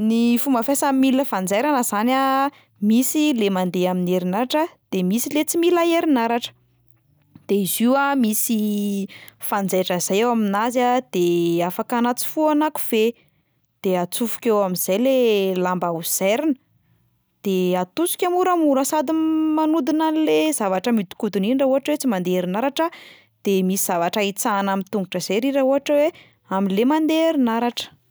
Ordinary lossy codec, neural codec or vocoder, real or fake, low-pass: none; none; real; none